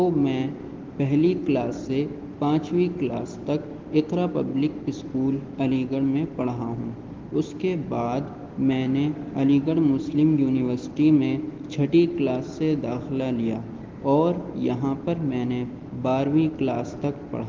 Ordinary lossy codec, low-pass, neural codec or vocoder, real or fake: Opus, 16 kbps; 7.2 kHz; none; real